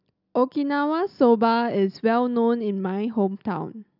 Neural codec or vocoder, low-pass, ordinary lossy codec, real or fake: none; 5.4 kHz; none; real